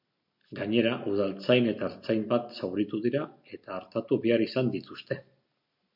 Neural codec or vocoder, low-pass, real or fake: none; 5.4 kHz; real